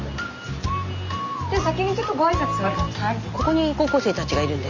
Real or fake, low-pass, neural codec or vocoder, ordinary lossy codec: real; 7.2 kHz; none; Opus, 64 kbps